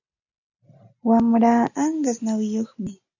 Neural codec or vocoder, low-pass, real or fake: none; 7.2 kHz; real